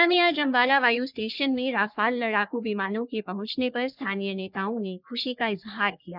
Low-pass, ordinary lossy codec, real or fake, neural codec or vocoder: 5.4 kHz; none; fake; codec, 44.1 kHz, 3.4 kbps, Pupu-Codec